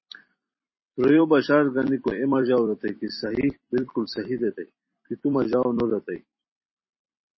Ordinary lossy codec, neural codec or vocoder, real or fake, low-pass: MP3, 24 kbps; vocoder, 44.1 kHz, 128 mel bands every 256 samples, BigVGAN v2; fake; 7.2 kHz